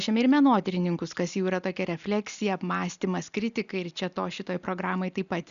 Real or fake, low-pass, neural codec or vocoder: real; 7.2 kHz; none